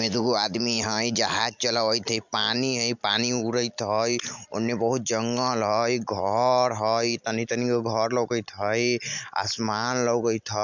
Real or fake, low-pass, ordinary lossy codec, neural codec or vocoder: real; 7.2 kHz; MP3, 64 kbps; none